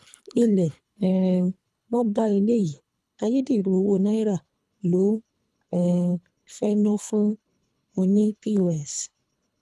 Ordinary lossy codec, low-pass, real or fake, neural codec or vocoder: none; none; fake; codec, 24 kHz, 3 kbps, HILCodec